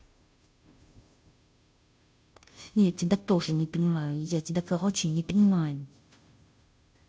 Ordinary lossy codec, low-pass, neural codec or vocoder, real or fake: none; none; codec, 16 kHz, 0.5 kbps, FunCodec, trained on Chinese and English, 25 frames a second; fake